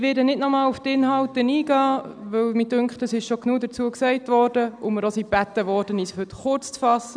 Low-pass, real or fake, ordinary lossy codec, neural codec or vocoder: 9.9 kHz; real; none; none